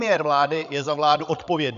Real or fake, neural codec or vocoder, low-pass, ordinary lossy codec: fake; codec, 16 kHz, 16 kbps, FreqCodec, larger model; 7.2 kHz; MP3, 96 kbps